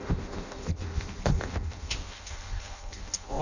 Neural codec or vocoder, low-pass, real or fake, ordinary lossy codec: codec, 16 kHz in and 24 kHz out, 0.6 kbps, FireRedTTS-2 codec; 7.2 kHz; fake; none